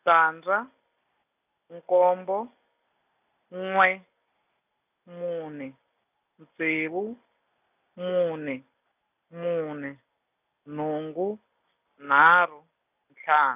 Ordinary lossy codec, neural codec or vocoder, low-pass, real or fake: none; none; 3.6 kHz; real